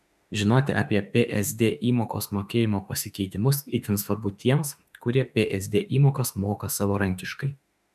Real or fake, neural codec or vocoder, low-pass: fake; autoencoder, 48 kHz, 32 numbers a frame, DAC-VAE, trained on Japanese speech; 14.4 kHz